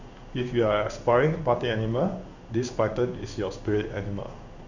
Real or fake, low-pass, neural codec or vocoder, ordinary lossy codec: fake; 7.2 kHz; codec, 16 kHz in and 24 kHz out, 1 kbps, XY-Tokenizer; Opus, 64 kbps